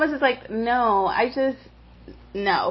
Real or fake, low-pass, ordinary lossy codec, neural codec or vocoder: real; 7.2 kHz; MP3, 24 kbps; none